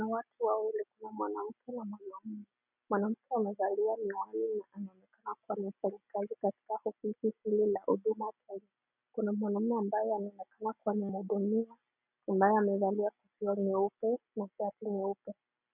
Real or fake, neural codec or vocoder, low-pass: real; none; 3.6 kHz